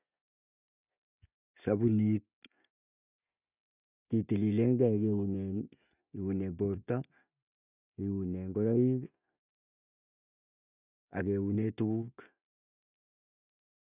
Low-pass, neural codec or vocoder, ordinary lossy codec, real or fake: 3.6 kHz; none; none; real